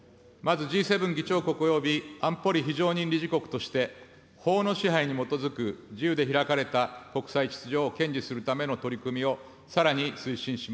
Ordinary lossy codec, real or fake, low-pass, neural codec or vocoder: none; real; none; none